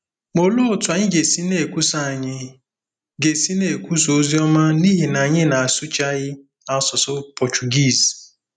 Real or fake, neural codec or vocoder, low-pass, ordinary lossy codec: real; none; 9.9 kHz; none